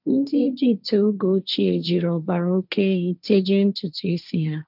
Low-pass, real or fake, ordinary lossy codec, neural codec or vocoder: 5.4 kHz; fake; none; codec, 16 kHz, 1.1 kbps, Voila-Tokenizer